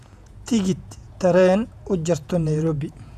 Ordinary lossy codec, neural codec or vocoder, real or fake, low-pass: none; vocoder, 48 kHz, 128 mel bands, Vocos; fake; 14.4 kHz